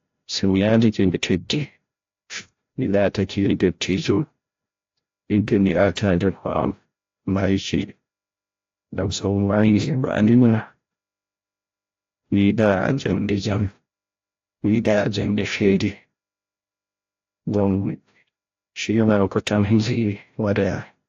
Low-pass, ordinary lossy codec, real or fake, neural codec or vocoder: 7.2 kHz; AAC, 48 kbps; fake; codec, 16 kHz, 0.5 kbps, FreqCodec, larger model